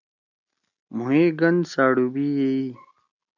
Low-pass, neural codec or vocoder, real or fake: 7.2 kHz; none; real